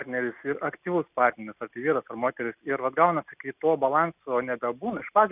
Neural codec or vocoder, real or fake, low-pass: none; real; 3.6 kHz